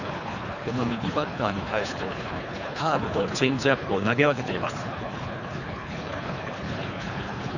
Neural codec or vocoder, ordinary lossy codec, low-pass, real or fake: codec, 24 kHz, 3 kbps, HILCodec; none; 7.2 kHz; fake